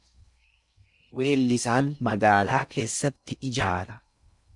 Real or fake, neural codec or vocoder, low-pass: fake; codec, 16 kHz in and 24 kHz out, 0.6 kbps, FocalCodec, streaming, 4096 codes; 10.8 kHz